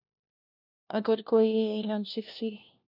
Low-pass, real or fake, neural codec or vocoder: 5.4 kHz; fake; codec, 16 kHz, 1 kbps, FunCodec, trained on LibriTTS, 50 frames a second